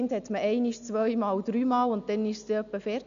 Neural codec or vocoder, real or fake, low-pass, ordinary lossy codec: none; real; 7.2 kHz; none